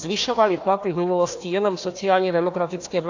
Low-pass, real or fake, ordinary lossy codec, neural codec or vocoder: 7.2 kHz; fake; AAC, 48 kbps; codec, 16 kHz, 1 kbps, FunCodec, trained on Chinese and English, 50 frames a second